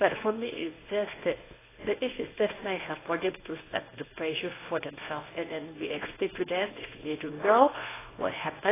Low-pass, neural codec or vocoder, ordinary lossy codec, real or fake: 3.6 kHz; codec, 24 kHz, 0.9 kbps, WavTokenizer, medium speech release version 1; AAC, 16 kbps; fake